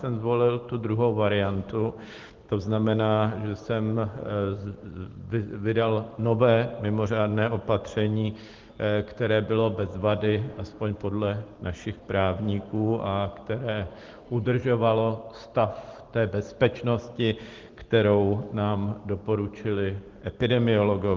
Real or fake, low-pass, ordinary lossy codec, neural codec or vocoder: real; 7.2 kHz; Opus, 16 kbps; none